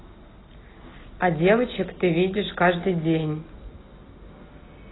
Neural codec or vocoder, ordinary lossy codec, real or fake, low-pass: none; AAC, 16 kbps; real; 7.2 kHz